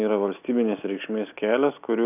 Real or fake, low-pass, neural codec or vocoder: real; 3.6 kHz; none